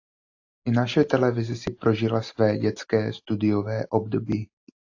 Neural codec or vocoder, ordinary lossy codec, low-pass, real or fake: none; AAC, 48 kbps; 7.2 kHz; real